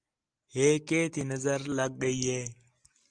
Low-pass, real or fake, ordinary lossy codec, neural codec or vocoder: 9.9 kHz; real; Opus, 24 kbps; none